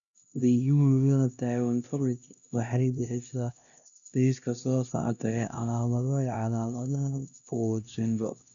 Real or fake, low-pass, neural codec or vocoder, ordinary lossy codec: fake; 7.2 kHz; codec, 16 kHz, 1 kbps, X-Codec, HuBERT features, trained on LibriSpeech; none